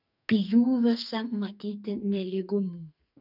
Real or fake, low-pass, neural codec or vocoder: fake; 5.4 kHz; codec, 44.1 kHz, 2.6 kbps, SNAC